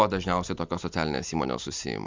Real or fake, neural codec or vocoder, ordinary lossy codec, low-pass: real; none; MP3, 64 kbps; 7.2 kHz